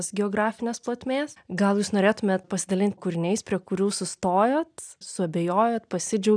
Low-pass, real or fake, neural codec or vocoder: 9.9 kHz; real; none